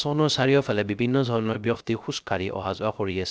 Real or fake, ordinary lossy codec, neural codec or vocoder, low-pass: fake; none; codec, 16 kHz, 0.3 kbps, FocalCodec; none